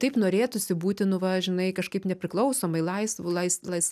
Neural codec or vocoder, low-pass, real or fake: none; 14.4 kHz; real